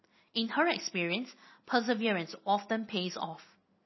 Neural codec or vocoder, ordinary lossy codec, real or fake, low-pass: none; MP3, 24 kbps; real; 7.2 kHz